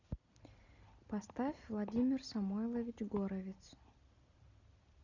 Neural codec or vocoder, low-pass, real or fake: none; 7.2 kHz; real